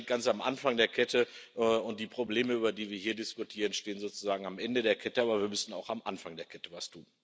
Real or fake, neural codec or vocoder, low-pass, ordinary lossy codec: real; none; none; none